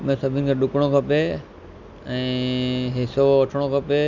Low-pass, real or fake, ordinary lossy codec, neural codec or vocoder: 7.2 kHz; real; none; none